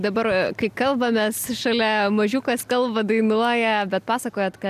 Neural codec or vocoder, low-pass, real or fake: none; 14.4 kHz; real